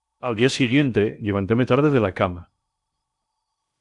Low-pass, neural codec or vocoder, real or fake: 10.8 kHz; codec, 16 kHz in and 24 kHz out, 0.8 kbps, FocalCodec, streaming, 65536 codes; fake